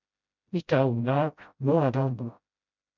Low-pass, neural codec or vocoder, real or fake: 7.2 kHz; codec, 16 kHz, 0.5 kbps, FreqCodec, smaller model; fake